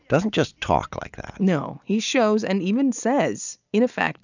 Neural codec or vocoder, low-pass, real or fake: none; 7.2 kHz; real